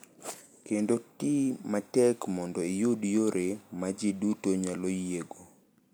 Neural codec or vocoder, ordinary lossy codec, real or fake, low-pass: none; none; real; none